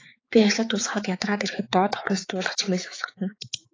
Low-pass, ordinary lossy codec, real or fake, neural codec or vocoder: 7.2 kHz; AAC, 32 kbps; fake; codec, 16 kHz, 4 kbps, X-Codec, HuBERT features, trained on balanced general audio